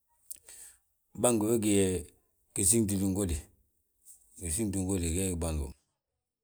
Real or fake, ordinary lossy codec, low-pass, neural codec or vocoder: real; none; none; none